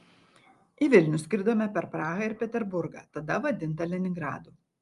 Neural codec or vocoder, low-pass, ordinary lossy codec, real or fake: none; 10.8 kHz; Opus, 32 kbps; real